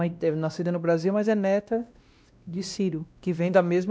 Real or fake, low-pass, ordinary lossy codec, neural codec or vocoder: fake; none; none; codec, 16 kHz, 1 kbps, X-Codec, WavLM features, trained on Multilingual LibriSpeech